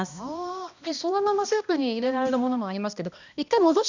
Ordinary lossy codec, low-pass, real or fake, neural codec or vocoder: none; 7.2 kHz; fake; codec, 16 kHz, 1 kbps, X-Codec, HuBERT features, trained on balanced general audio